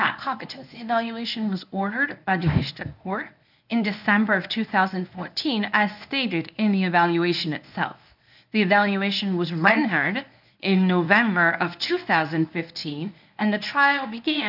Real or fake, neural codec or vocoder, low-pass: fake; codec, 24 kHz, 0.9 kbps, WavTokenizer, medium speech release version 1; 5.4 kHz